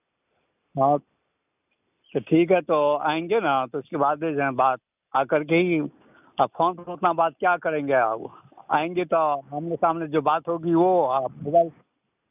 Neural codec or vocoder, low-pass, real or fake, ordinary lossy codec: none; 3.6 kHz; real; none